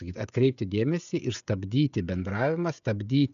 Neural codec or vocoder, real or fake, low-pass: codec, 16 kHz, 16 kbps, FreqCodec, smaller model; fake; 7.2 kHz